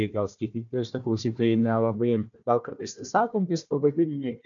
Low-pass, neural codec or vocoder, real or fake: 7.2 kHz; codec, 16 kHz, 1 kbps, FunCodec, trained on Chinese and English, 50 frames a second; fake